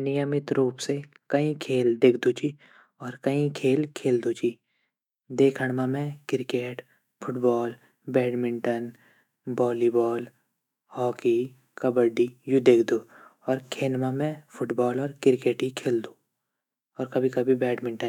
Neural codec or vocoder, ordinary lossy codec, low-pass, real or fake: autoencoder, 48 kHz, 128 numbers a frame, DAC-VAE, trained on Japanese speech; none; 19.8 kHz; fake